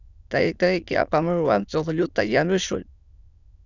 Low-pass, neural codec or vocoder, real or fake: 7.2 kHz; autoencoder, 22.05 kHz, a latent of 192 numbers a frame, VITS, trained on many speakers; fake